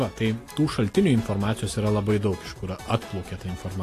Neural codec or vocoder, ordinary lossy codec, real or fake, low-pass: none; AAC, 48 kbps; real; 14.4 kHz